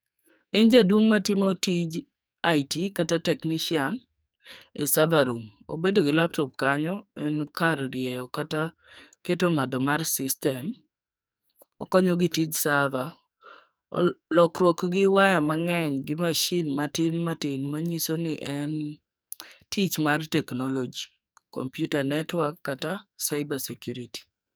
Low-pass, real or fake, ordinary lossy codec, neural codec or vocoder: none; fake; none; codec, 44.1 kHz, 2.6 kbps, SNAC